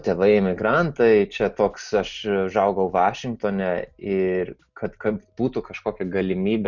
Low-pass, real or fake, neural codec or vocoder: 7.2 kHz; real; none